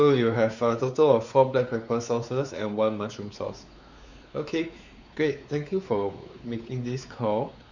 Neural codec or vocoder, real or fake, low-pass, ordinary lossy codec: codec, 16 kHz, 4 kbps, X-Codec, WavLM features, trained on Multilingual LibriSpeech; fake; 7.2 kHz; none